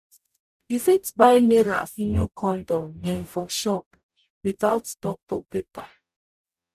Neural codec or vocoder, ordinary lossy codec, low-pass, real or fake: codec, 44.1 kHz, 0.9 kbps, DAC; none; 14.4 kHz; fake